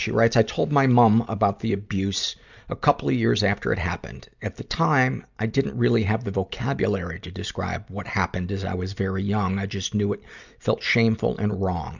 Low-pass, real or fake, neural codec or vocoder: 7.2 kHz; real; none